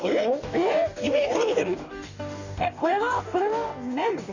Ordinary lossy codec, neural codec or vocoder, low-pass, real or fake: none; codec, 44.1 kHz, 2.6 kbps, DAC; 7.2 kHz; fake